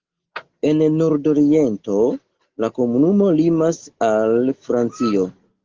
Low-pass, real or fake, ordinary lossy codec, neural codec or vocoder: 7.2 kHz; real; Opus, 16 kbps; none